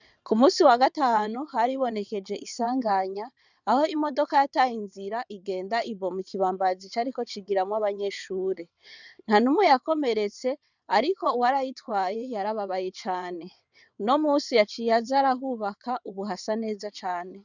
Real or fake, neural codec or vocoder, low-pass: fake; vocoder, 22.05 kHz, 80 mel bands, WaveNeXt; 7.2 kHz